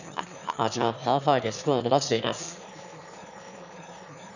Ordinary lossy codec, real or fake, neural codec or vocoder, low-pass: none; fake; autoencoder, 22.05 kHz, a latent of 192 numbers a frame, VITS, trained on one speaker; 7.2 kHz